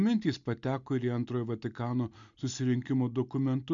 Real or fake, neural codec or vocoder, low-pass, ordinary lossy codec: real; none; 7.2 kHz; MP3, 64 kbps